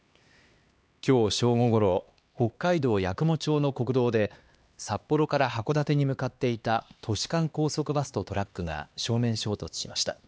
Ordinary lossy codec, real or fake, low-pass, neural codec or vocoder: none; fake; none; codec, 16 kHz, 2 kbps, X-Codec, HuBERT features, trained on LibriSpeech